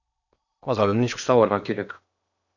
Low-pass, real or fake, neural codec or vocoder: 7.2 kHz; fake; codec, 16 kHz in and 24 kHz out, 0.8 kbps, FocalCodec, streaming, 65536 codes